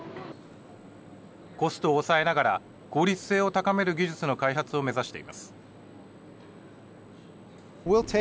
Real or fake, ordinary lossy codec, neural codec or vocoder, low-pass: real; none; none; none